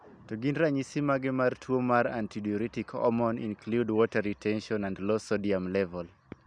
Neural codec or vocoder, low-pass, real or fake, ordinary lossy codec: none; 9.9 kHz; real; none